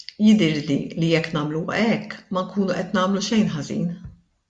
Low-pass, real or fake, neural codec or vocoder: 9.9 kHz; real; none